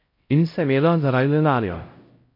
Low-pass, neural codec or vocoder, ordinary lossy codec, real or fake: 5.4 kHz; codec, 16 kHz, 0.5 kbps, X-Codec, HuBERT features, trained on LibriSpeech; MP3, 32 kbps; fake